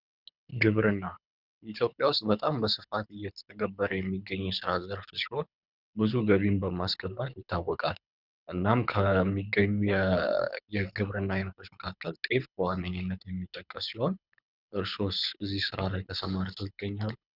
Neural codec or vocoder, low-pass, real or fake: codec, 24 kHz, 6 kbps, HILCodec; 5.4 kHz; fake